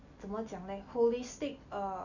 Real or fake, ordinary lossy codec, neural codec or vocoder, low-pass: real; none; none; 7.2 kHz